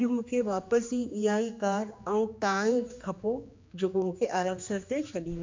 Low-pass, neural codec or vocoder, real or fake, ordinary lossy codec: 7.2 kHz; codec, 16 kHz, 2 kbps, X-Codec, HuBERT features, trained on general audio; fake; MP3, 64 kbps